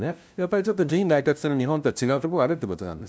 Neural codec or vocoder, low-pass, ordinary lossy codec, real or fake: codec, 16 kHz, 0.5 kbps, FunCodec, trained on LibriTTS, 25 frames a second; none; none; fake